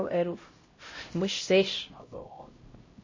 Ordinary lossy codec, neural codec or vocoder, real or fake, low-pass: MP3, 32 kbps; codec, 16 kHz, 0.5 kbps, X-Codec, HuBERT features, trained on LibriSpeech; fake; 7.2 kHz